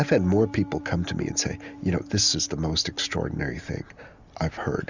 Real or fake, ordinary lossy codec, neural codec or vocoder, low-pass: real; Opus, 64 kbps; none; 7.2 kHz